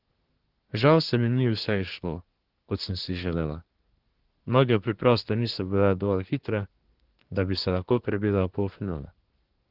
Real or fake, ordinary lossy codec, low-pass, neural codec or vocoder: fake; Opus, 16 kbps; 5.4 kHz; codec, 24 kHz, 1 kbps, SNAC